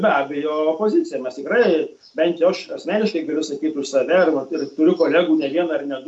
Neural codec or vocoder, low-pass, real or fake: none; 10.8 kHz; real